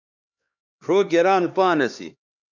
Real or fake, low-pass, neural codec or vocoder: fake; 7.2 kHz; codec, 16 kHz, 2 kbps, X-Codec, HuBERT features, trained on LibriSpeech